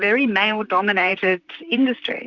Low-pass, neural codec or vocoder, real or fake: 7.2 kHz; vocoder, 44.1 kHz, 128 mel bands, Pupu-Vocoder; fake